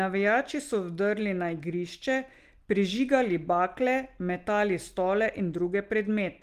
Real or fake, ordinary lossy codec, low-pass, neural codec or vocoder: real; Opus, 24 kbps; 14.4 kHz; none